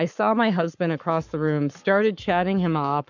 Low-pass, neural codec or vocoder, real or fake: 7.2 kHz; codec, 44.1 kHz, 7.8 kbps, Pupu-Codec; fake